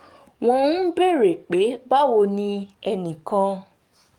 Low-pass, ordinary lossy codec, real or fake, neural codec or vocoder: 19.8 kHz; Opus, 32 kbps; fake; codec, 44.1 kHz, 7.8 kbps, DAC